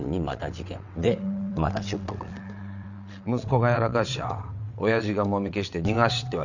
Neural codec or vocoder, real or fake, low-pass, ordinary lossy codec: vocoder, 22.05 kHz, 80 mel bands, WaveNeXt; fake; 7.2 kHz; none